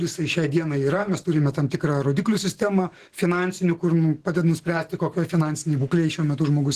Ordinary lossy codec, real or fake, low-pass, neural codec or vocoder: Opus, 16 kbps; fake; 14.4 kHz; autoencoder, 48 kHz, 128 numbers a frame, DAC-VAE, trained on Japanese speech